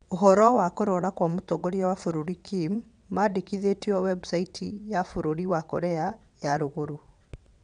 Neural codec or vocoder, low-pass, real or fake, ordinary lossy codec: vocoder, 22.05 kHz, 80 mel bands, WaveNeXt; 9.9 kHz; fake; none